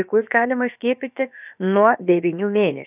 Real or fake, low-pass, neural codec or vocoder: fake; 3.6 kHz; codec, 16 kHz, about 1 kbps, DyCAST, with the encoder's durations